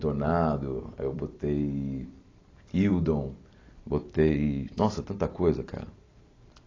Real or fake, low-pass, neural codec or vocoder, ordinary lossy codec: fake; 7.2 kHz; vocoder, 44.1 kHz, 128 mel bands every 256 samples, BigVGAN v2; AAC, 32 kbps